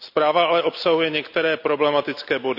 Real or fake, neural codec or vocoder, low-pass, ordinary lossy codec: real; none; 5.4 kHz; none